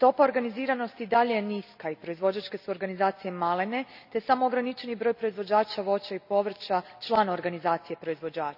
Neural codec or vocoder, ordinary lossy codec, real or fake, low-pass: none; none; real; 5.4 kHz